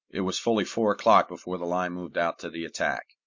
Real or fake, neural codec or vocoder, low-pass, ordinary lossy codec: real; none; 7.2 kHz; MP3, 48 kbps